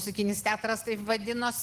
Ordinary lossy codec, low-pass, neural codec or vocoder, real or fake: Opus, 16 kbps; 14.4 kHz; none; real